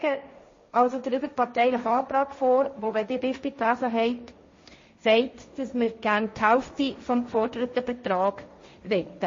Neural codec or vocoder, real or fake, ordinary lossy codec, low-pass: codec, 16 kHz, 1.1 kbps, Voila-Tokenizer; fake; MP3, 32 kbps; 7.2 kHz